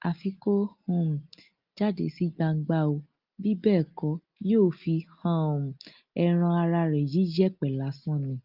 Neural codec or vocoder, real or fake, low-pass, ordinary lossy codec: none; real; 5.4 kHz; Opus, 24 kbps